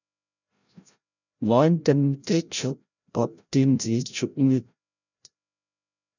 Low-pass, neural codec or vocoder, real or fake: 7.2 kHz; codec, 16 kHz, 0.5 kbps, FreqCodec, larger model; fake